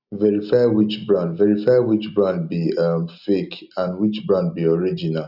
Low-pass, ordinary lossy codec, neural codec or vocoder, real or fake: 5.4 kHz; none; none; real